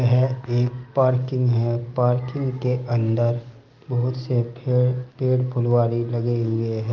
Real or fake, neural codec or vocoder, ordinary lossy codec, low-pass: real; none; Opus, 24 kbps; 7.2 kHz